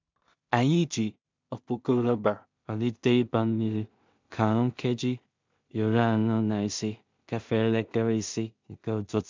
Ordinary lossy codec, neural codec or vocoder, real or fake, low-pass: MP3, 64 kbps; codec, 16 kHz in and 24 kHz out, 0.4 kbps, LongCat-Audio-Codec, two codebook decoder; fake; 7.2 kHz